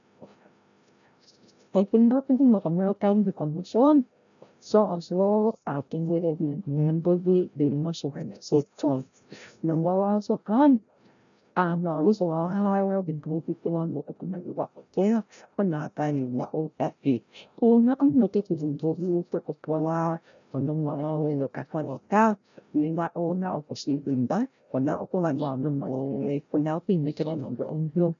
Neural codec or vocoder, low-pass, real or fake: codec, 16 kHz, 0.5 kbps, FreqCodec, larger model; 7.2 kHz; fake